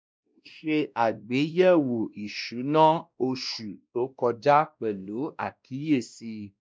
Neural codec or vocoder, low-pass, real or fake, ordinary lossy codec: codec, 16 kHz, 1 kbps, X-Codec, WavLM features, trained on Multilingual LibriSpeech; none; fake; none